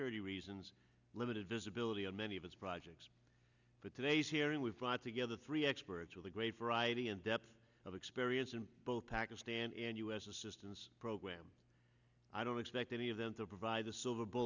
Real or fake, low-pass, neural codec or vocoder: real; 7.2 kHz; none